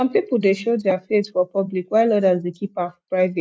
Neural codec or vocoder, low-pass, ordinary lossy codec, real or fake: none; none; none; real